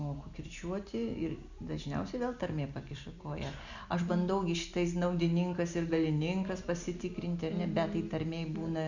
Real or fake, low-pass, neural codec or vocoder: real; 7.2 kHz; none